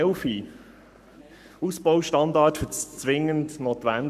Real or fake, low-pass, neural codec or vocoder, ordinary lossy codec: fake; 14.4 kHz; codec, 44.1 kHz, 7.8 kbps, Pupu-Codec; none